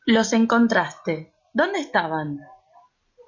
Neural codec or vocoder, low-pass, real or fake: none; 7.2 kHz; real